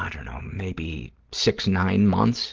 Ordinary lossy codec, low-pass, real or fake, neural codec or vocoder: Opus, 16 kbps; 7.2 kHz; real; none